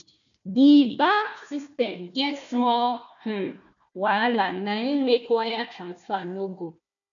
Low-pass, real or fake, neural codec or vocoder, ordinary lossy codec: 7.2 kHz; fake; codec, 16 kHz, 1 kbps, FunCodec, trained on Chinese and English, 50 frames a second; none